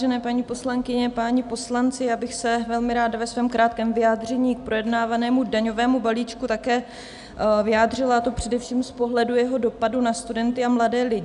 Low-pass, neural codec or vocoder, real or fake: 10.8 kHz; none; real